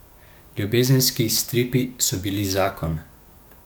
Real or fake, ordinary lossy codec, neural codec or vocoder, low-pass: fake; none; codec, 44.1 kHz, 7.8 kbps, DAC; none